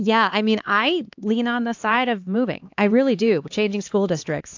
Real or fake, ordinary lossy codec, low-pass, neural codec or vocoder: fake; AAC, 48 kbps; 7.2 kHz; codec, 16 kHz, 2 kbps, X-Codec, HuBERT features, trained on LibriSpeech